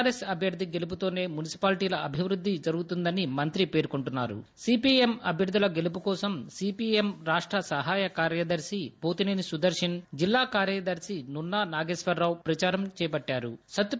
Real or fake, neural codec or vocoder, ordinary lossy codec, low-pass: real; none; none; none